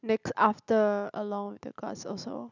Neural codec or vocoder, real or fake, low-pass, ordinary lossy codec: none; real; 7.2 kHz; none